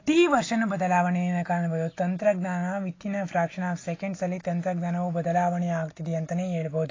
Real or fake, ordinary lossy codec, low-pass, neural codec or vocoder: real; AAC, 32 kbps; 7.2 kHz; none